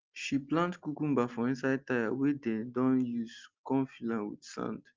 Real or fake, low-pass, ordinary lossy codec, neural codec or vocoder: real; 7.2 kHz; Opus, 32 kbps; none